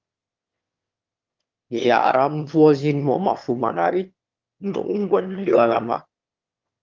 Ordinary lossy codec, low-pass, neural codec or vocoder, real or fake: Opus, 24 kbps; 7.2 kHz; autoencoder, 22.05 kHz, a latent of 192 numbers a frame, VITS, trained on one speaker; fake